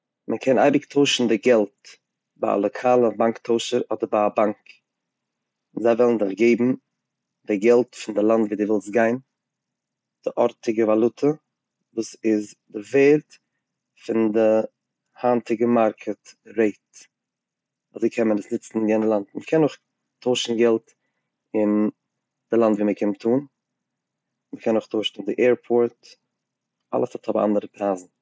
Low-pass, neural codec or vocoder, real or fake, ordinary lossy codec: none; none; real; none